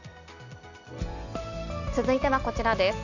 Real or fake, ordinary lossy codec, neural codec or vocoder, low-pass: real; none; none; 7.2 kHz